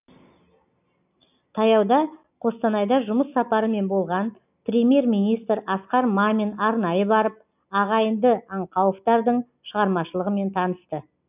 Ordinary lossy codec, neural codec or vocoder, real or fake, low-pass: none; none; real; 3.6 kHz